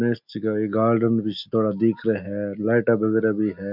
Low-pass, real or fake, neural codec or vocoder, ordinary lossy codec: 5.4 kHz; real; none; none